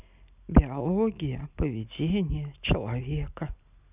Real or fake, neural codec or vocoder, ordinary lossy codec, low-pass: real; none; none; 3.6 kHz